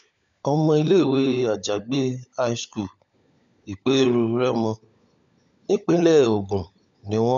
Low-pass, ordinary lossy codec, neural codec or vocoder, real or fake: 7.2 kHz; none; codec, 16 kHz, 16 kbps, FunCodec, trained on LibriTTS, 50 frames a second; fake